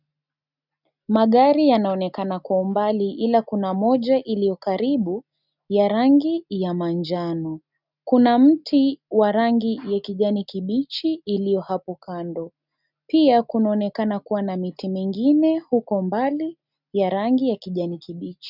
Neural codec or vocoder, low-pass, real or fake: none; 5.4 kHz; real